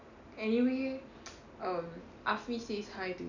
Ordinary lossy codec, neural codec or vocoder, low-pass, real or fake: none; none; 7.2 kHz; real